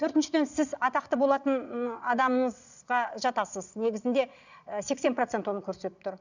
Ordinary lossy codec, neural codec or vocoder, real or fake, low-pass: none; vocoder, 22.05 kHz, 80 mel bands, Vocos; fake; 7.2 kHz